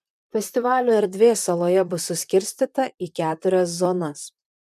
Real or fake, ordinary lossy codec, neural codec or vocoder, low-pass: fake; MP3, 96 kbps; vocoder, 44.1 kHz, 128 mel bands, Pupu-Vocoder; 14.4 kHz